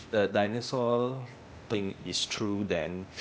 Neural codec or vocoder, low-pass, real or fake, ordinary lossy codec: codec, 16 kHz, 0.8 kbps, ZipCodec; none; fake; none